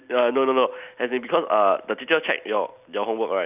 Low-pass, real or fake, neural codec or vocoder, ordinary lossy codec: 3.6 kHz; real; none; none